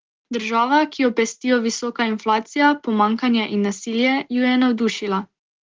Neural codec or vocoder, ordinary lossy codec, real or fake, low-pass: none; Opus, 16 kbps; real; 7.2 kHz